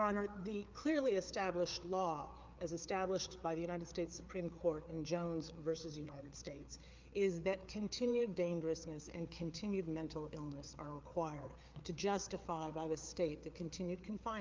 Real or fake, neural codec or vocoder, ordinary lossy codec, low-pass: fake; codec, 16 kHz, 4 kbps, FreqCodec, larger model; Opus, 24 kbps; 7.2 kHz